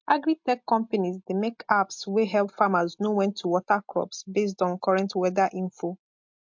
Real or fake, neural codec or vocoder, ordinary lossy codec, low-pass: real; none; MP3, 48 kbps; 7.2 kHz